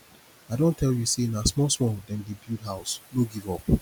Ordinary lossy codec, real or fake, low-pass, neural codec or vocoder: none; real; none; none